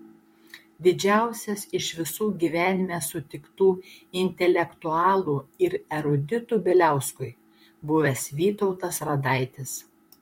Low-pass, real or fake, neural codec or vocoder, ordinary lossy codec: 19.8 kHz; fake; vocoder, 44.1 kHz, 128 mel bands, Pupu-Vocoder; MP3, 64 kbps